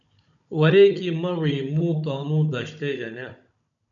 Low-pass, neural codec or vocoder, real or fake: 7.2 kHz; codec, 16 kHz, 16 kbps, FunCodec, trained on Chinese and English, 50 frames a second; fake